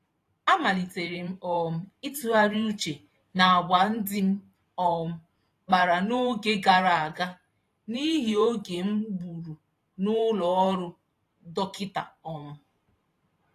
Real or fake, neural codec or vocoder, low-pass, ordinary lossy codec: fake; vocoder, 44.1 kHz, 128 mel bands every 512 samples, BigVGAN v2; 14.4 kHz; AAC, 48 kbps